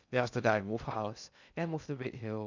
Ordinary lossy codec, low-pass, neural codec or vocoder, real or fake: none; 7.2 kHz; codec, 16 kHz in and 24 kHz out, 0.6 kbps, FocalCodec, streaming, 2048 codes; fake